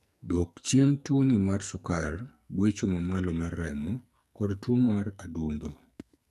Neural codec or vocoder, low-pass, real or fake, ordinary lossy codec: codec, 44.1 kHz, 2.6 kbps, SNAC; 14.4 kHz; fake; none